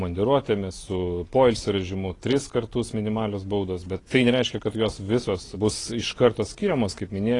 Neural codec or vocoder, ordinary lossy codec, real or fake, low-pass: none; AAC, 32 kbps; real; 10.8 kHz